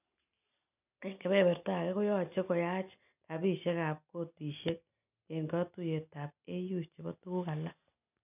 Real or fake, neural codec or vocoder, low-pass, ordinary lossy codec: real; none; 3.6 kHz; none